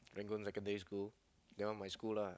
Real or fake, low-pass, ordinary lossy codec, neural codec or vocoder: real; none; none; none